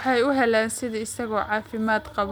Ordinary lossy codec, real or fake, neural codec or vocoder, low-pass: none; real; none; none